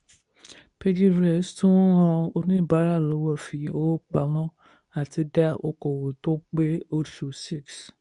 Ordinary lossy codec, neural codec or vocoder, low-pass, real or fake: none; codec, 24 kHz, 0.9 kbps, WavTokenizer, medium speech release version 2; 10.8 kHz; fake